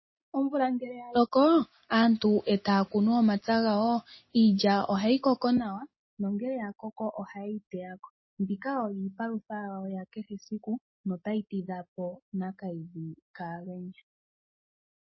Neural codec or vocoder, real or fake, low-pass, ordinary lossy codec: none; real; 7.2 kHz; MP3, 24 kbps